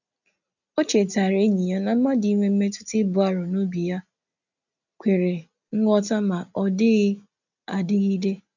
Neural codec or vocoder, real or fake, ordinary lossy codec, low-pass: none; real; none; 7.2 kHz